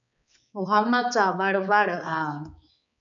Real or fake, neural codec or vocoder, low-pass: fake; codec, 16 kHz, 4 kbps, X-Codec, HuBERT features, trained on balanced general audio; 7.2 kHz